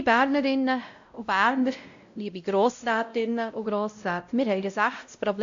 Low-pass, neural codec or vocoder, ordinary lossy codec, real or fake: 7.2 kHz; codec, 16 kHz, 0.5 kbps, X-Codec, WavLM features, trained on Multilingual LibriSpeech; AAC, 64 kbps; fake